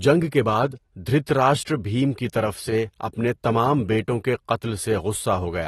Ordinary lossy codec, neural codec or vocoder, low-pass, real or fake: AAC, 32 kbps; none; 19.8 kHz; real